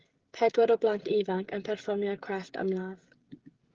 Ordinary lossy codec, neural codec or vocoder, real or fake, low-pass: Opus, 16 kbps; codec, 16 kHz, 16 kbps, FreqCodec, smaller model; fake; 7.2 kHz